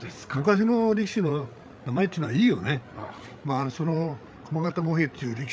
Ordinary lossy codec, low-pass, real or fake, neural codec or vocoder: none; none; fake; codec, 16 kHz, 8 kbps, FreqCodec, larger model